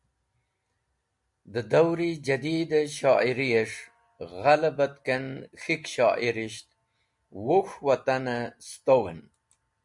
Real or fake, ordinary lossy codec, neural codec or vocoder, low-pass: real; MP3, 96 kbps; none; 10.8 kHz